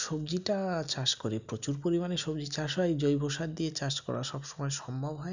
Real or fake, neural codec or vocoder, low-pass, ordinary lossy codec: real; none; 7.2 kHz; none